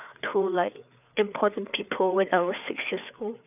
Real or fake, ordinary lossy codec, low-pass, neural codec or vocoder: fake; none; 3.6 kHz; codec, 16 kHz, 4 kbps, FreqCodec, larger model